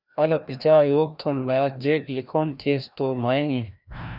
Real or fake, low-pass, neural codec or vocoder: fake; 5.4 kHz; codec, 16 kHz, 1 kbps, FreqCodec, larger model